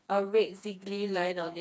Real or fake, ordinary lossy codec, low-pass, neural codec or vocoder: fake; none; none; codec, 16 kHz, 2 kbps, FreqCodec, smaller model